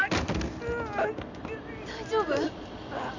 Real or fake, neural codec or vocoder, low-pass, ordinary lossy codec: real; none; 7.2 kHz; MP3, 64 kbps